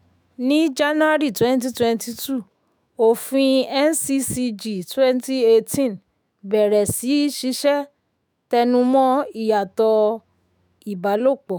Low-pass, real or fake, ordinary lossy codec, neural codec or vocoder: none; fake; none; autoencoder, 48 kHz, 128 numbers a frame, DAC-VAE, trained on Japanese speech